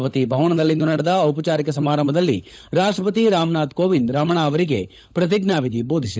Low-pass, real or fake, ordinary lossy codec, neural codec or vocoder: none; fake; none; codec, 16 kHz, 16 kbps, FunCodec, trained on LibriTTS, 50 frames a second